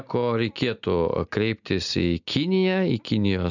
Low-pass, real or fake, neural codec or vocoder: 7.2 kHz; real; none